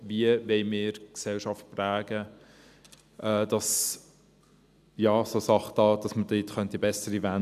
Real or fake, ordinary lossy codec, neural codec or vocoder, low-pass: real; none; none; 14.4 kHz